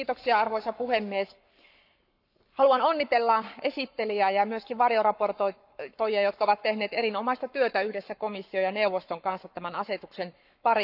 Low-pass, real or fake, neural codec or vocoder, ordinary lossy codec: 5.4 kHz; fake; codec, 44.1 kHz, 7.8 kbps, Pupu-Codec; none